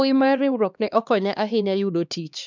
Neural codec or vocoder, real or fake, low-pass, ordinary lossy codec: codec, 16 kHz, 2 kbps, X-Codec, HuBERT features, trained on LibriSpeech; fake; 7.2 kHz; none